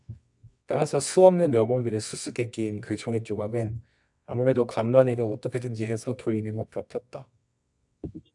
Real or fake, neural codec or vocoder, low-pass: fake; codec, 24 kHz, 0.9 kbps, WavTokenizer, medium music audio release; 10.8 kHz